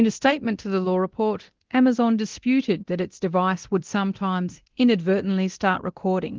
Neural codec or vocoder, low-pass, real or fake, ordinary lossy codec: codec, 24 kHz, 0.9 kbps, DualCodec; 7.2 kHz; fake; Opus, 16 kbps